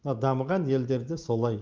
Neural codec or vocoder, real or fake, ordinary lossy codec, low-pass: none; real; Opus, 32 kbps; 7.2 kHz